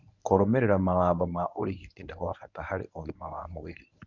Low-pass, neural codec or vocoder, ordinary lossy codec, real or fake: 7.2 kHz; codec, 24 kHz, 0.9 kbps, WavTokenizer, medium speech release version 1; none; fake